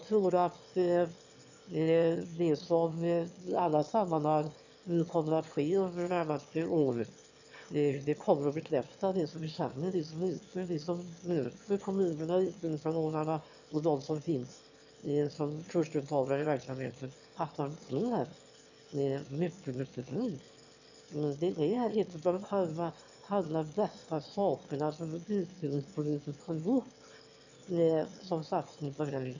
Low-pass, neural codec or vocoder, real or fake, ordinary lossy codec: 7.2 kHz; autoencoder, 22.05 kHz, a latent of 192 numbers a frame, VITS, trained on one speaker; fake; none